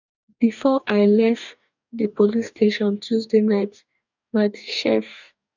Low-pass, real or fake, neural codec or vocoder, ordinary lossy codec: 7.2 kHz; fake; codec, 44.1 kHz, 2.6 kbps, DAC; none